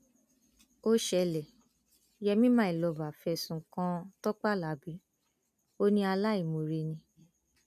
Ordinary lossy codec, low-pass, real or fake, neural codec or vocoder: none; 14.4 kHz; real; none